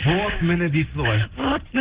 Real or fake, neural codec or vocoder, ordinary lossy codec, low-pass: real; none; Opus, 16 kbps; 3.6 kHz